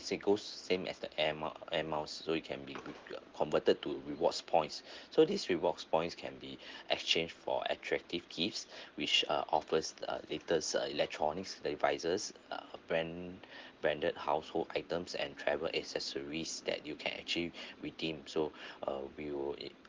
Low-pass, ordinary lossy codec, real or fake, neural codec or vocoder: 7.2 kHz; Opus, 16 kbps; real; none